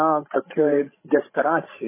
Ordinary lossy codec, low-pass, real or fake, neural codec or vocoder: MP3, 16 kbps; 3.6 kHz; fake; codec, 16 kHz, 16 kbps, FreqCodec, larger model